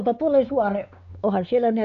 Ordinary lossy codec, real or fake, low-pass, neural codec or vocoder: AAC, 96 kbps; fake; 7.2 kHz; codec, 16 kHz, 4 kbps, X-Codec, WavLM features, trained on Multilingual LibriSpeech